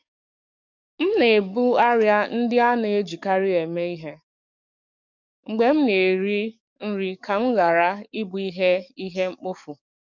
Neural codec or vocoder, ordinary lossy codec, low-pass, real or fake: codec, 44.1 kHz, 7.8 kbps, DAC; MP3, 64 kbps; 7.2 kHz; fake